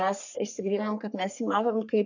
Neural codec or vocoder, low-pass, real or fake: codec, 44.1 kHz, 3.4 kbps, Pupu-Codec; 7.2 kHz; fake